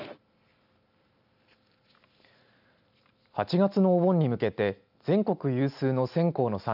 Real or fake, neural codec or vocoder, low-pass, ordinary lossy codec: real; none; 5.4 kHz; none